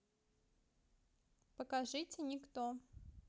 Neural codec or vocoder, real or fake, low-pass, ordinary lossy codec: none; real; none; none